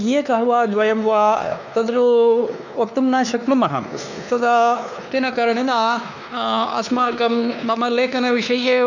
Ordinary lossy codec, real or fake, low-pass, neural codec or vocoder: none; fake; 7.2 kHz; codec, 16 kHz, 2 kbps, X-Codec, WavLM features, trained on Multilingual LibriSpeech